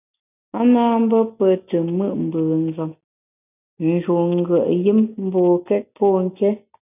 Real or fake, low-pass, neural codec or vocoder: real; 3.6 kHz; none